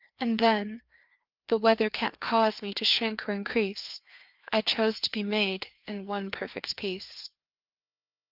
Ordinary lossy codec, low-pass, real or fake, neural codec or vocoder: Opus, 24 kbps; 5.4 kHz; fake; codec, 16 kHz, 2 kbps, FreqCodec, larger model